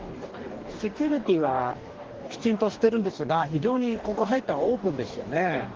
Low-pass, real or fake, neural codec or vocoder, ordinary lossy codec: 7.2 kHz; fake; codec, 44.1 kHz, 2.6 kbps, DAC; Opus, 16 kbps